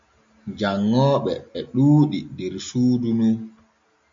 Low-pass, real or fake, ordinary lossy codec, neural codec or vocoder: 7.2 kHz; real; MP3, 48 kbps; none